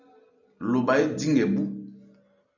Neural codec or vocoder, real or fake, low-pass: none; real; 7.2 kHz